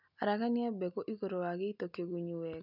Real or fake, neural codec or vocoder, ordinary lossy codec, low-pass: real; none; none; 5.4 kHz